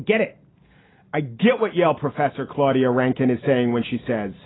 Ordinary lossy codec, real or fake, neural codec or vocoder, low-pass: AAC, 16 kbps; real; none; 7.2 kHz